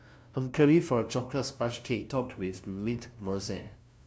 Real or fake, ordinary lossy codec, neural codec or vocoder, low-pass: fake; none; codec, 16 kHz, 0.5 kbps, FunCodec, trained on LibriTTS, 25 frames a second; none